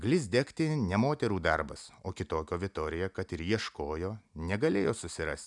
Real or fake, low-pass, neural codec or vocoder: real; 10.8 kHz; none